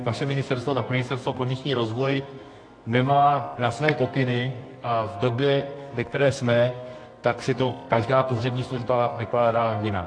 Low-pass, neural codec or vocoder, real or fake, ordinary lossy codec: 9.9 kHz; codec, 24 kHz, 0.9 kbps, WavTokenizer, medium music audio release; fake; AAC, 48 kbps